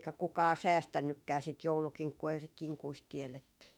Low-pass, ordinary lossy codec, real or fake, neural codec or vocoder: 19.8 kHz; none; fake; autoencoder, 48 kHz, 32 numbers a frame, DAC-VAE, trained on Japanese speech